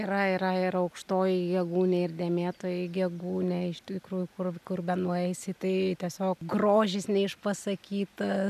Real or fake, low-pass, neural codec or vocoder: real; 14.4 kHz; none